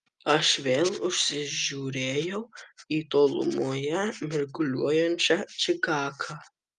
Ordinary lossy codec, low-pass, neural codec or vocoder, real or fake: Opus, 32 kbps; 10.8 kHz; none; real